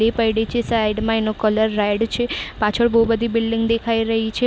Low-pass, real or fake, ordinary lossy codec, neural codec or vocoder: none; real; none; none